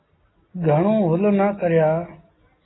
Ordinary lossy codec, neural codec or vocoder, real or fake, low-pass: AAC, 16 kbps; none; real; 7.2 kHz